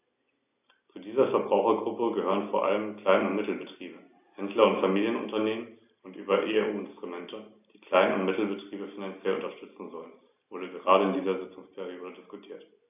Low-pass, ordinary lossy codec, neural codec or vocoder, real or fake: 3.6 kHz; AAC, 32 kbps; none; real